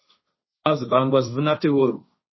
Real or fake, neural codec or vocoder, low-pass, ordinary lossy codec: fake; codec, 16 kHz, 1.1 kbps, Voila-Tokenizer; 7.2 kHz; MP3, 24 kbps